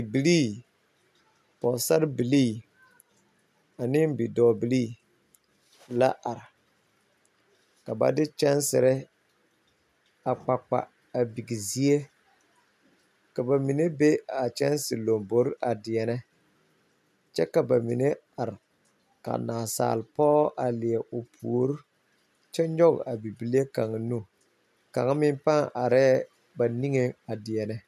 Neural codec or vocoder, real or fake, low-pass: none; real; 14.4 kHz